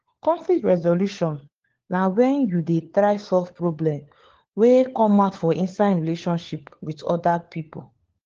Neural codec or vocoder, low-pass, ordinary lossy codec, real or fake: codec, 16 kHz, 4 kbps, FunCodec, trained on LibriTTS, 50 frames a second; 7.2 kHz; Opus, 16 kbps; fake